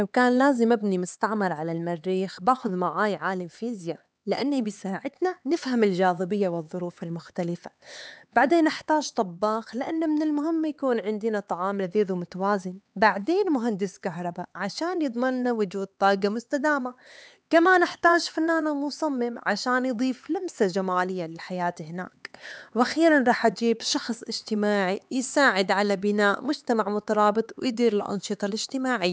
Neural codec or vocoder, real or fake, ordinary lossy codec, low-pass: codec, 16 kHz, 4 kbps, X-Codec, HuBERT features, trained on LibriSpeech; fake; none; none